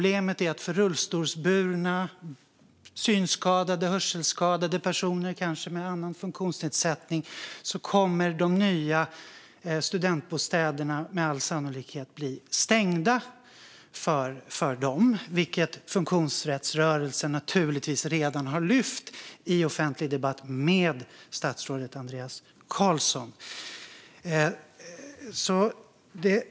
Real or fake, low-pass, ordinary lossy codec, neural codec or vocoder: real; none; none; none